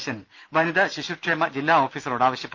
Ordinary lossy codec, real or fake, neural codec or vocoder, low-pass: Opus, 24 kbps; real; none; 7.2 kHz